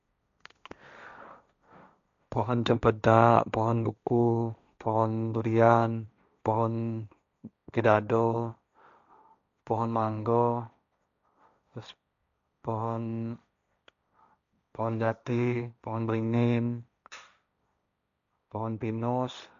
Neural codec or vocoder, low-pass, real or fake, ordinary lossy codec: codec, 16 kHz, 1.1 kbps, Voila-Tokenizer; 7.2 kHz; fake; none